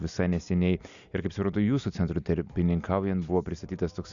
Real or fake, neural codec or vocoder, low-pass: real; none; 7.2 kHz